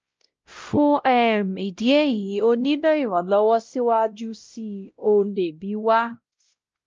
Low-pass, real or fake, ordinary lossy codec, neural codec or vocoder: 7.2 kHz; fake; Opus, 24 kbps; codec, 16 kHz, 0.5 kbps, X-Codec, WavLM features, trained on Multilingual LibriSpeech